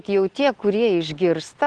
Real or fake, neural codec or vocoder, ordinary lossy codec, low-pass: real; none; Opus, 16 kbps; 9.9 kHz